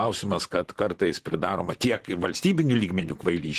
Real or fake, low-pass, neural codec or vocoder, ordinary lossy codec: fake; 14.4 kHz; vocoder, 44.1 kHz, 128 mel bands, Pupu-Vocoder; Opus, 16 kbps